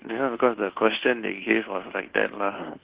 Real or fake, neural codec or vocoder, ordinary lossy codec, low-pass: fake; codec, 24 kHz, 1.2 kbps, DualCodec; Opus, 16 kbps; 3.6 kHz